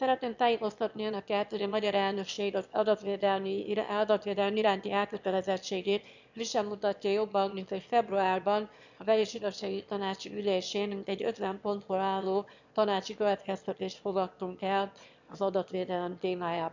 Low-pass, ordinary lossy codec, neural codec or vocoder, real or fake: 7.2 kHz; none; autoencoder, 22.05 kHz, a latent of 192 numbers a frame, VITS, trained on one speaker; fake